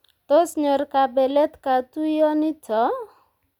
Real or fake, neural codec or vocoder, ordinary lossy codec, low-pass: real; none; none; 19.8 kHz